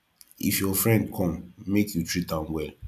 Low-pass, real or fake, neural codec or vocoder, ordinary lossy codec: 14.4 kHz; fake; vocoder, 44.1 kHz, 128 mel bands every 256 samples, BigVGAN v2; none